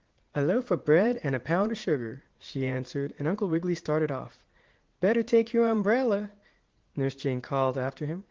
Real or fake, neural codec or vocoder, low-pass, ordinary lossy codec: fake; vocoder, 44.1 kHz, 80 mel bands, Vocos; 7.2 kHz; Opus, 16 kbps